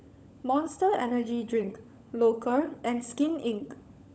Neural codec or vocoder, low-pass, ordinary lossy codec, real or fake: codec, 16 kHz, 16 kbps, FunCodec, trained on LibriTTS, 50 frames a second; none; none; fake